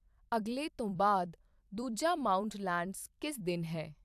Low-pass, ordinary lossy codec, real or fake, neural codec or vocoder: 14.4 kHz; AAC, 96 kbps; fake; vocoder, 44.1 kHz, 128 mel bands every 256 samples, BigVGAN v2